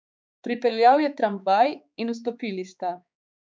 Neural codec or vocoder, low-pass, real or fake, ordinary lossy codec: codec, 16 kHz, 4 kbps, X-Codec, HuBERT features, trained on balanced general audio; none; fake; none